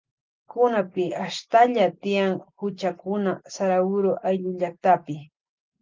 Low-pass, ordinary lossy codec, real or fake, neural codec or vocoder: 7.2 kHz; Opus, 32 kbps; real; none